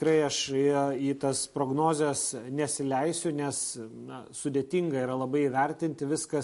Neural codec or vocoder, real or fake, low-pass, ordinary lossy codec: none; real; 14.4 kHz; MP3, 48 kbps